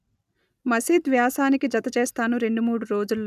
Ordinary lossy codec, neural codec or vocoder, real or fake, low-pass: none; none; real; 14.4 kHz